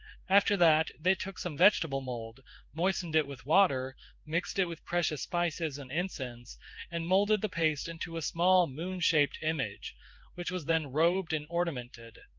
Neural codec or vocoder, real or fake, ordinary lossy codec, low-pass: codec, 16 kHz in and 24 kHz out, 1 kbps, XY-Tokenizer; fake; Opus, 24 kbps; 7.2 kHz